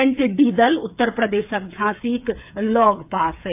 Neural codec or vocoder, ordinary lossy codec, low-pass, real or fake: codec, 16 kHz, 8 kbps, FreqCodec, smaller model; AAC, 32 kbps; 3.6 kHz; fake